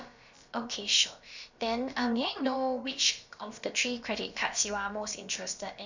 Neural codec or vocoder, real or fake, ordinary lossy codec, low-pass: codec, 16 kHz, about 1 kbps, DyCAST, with the encoder's durations; fake; Opus, 64 kbps; 7.2 kHz